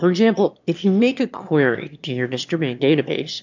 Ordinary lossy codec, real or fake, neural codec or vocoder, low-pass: MP3, 64 kbps; fake; autoencoder, 22.05 kHz, a latent of 192 numbers a frame, VITS, trained on one speaker; 7.2 kHz